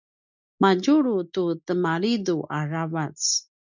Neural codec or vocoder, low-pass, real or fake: none; 7.2 kHz; real